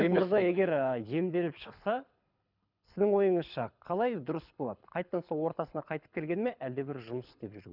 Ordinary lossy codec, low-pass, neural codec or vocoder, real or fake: none; 5.4 kHz; codec, 24 kHz, 6 kbps, HILCodec; fake